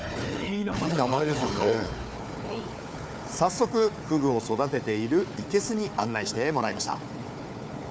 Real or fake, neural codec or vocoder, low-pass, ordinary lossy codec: fake; codec, 16 kHz, 4 kbps, FunCodec, trained on Chinese and English, 50 frames a second; none; none